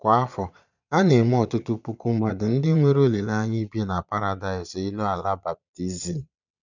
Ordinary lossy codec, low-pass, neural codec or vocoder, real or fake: none; 7.2 kHz; vocoder, 22.05 kHz, 80 mel bands, Vocos; fake